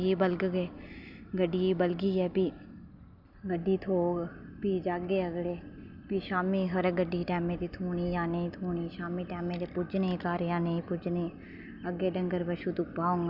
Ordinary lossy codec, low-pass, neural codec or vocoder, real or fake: none; 5.4 kHz; none; real